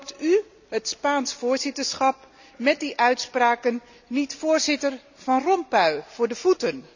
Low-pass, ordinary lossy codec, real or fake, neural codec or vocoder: 7.2 kHz; none; real; none